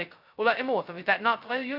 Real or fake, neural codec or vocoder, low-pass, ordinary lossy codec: fake; codec, 16 kHz, 0.2 kbps, FocalCodec; 5.4 kHz; none